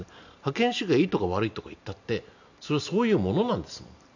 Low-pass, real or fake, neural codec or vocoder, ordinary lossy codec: 7.2 kHz; real; none; none